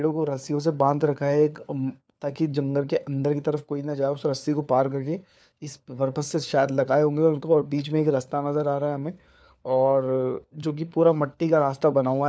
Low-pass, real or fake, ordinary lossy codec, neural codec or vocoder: none; fake; none; codec, 16 kHz, 4 kbps, FunCodec, trained on LibriTTS, 50 frames a second